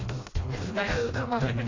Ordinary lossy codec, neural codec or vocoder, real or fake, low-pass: AAC, 32 kbps; codec, 16 kHz, 1 kbps, FreqCodec, smaller model; fake; 7.2 kHz